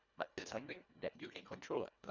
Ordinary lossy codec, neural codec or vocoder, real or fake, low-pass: none; codec, 24 kHz, 1.5 kbps, HILCodec; fake; 7.2 kHz